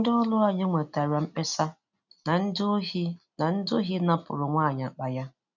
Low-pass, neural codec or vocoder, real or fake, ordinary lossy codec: 7.2 kHz; none; real; none